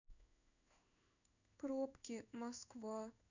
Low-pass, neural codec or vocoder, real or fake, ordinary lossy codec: 7.2 kHz; codec, 16 kHz in and 24 kHz out, 1 kbps, XY-Tokenizer; fake; none